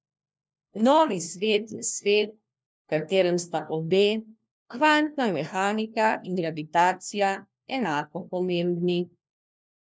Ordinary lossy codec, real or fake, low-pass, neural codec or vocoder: none; fake; none; codec, 16 kHz, 1 kbps, FunCodec, trained on LibriTTS, 50 frames a second